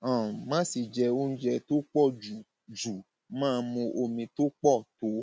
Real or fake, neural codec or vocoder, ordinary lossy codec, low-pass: real; none; none; none